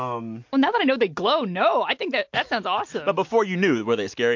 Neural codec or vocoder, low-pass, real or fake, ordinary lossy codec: none; 7.2 kHz; real; MP3, 48 kbps